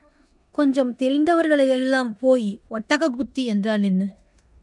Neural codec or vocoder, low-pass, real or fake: codec, 16 kHz in and 24 kHz out, 0.9 kbps, LongCat-Audio-Codec, four codebook decoder; 10.8 kHz; fake